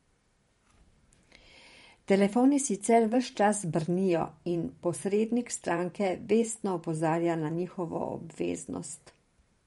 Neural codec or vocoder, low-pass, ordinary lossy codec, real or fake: vocoder, 44.1 kHz, 128 mel bands every 512 samples, BigVGAN v2; 19.8 kHz; MP3, 48 kbps; fake